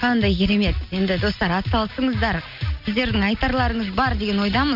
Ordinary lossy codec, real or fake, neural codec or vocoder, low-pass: none; real; none; 5.4 kHz